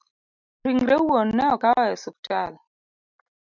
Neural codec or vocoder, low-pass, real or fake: none; 7.2 kHz; real